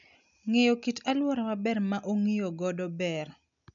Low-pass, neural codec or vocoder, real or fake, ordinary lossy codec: 7.2 kHz; none; real; none